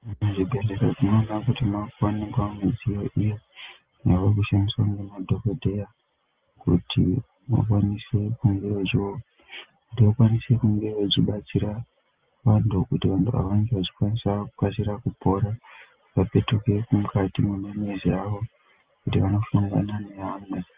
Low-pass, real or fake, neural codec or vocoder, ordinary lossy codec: 3.6 kHz; real; none; Opus, 32 kbps